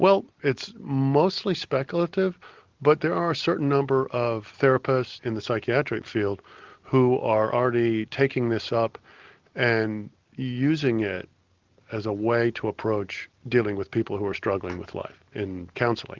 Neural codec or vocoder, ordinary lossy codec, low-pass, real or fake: none; Opus, 32 kbps; 7.2 kHz; real